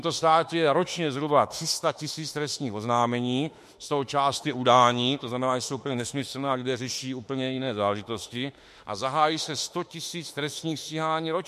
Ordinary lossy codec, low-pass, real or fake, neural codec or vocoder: MP3, 64 kbps; 14.4 kHz; fake; autoencoder, 48 kHz, 32 numbers a frame, DAC-VAE, trained on Japanese speech